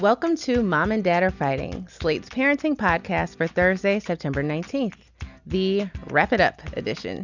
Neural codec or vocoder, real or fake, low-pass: none; real; 7.2 kHz